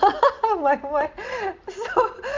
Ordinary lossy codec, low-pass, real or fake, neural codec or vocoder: Opus, 24 kbps; 7.2 kHz; real; none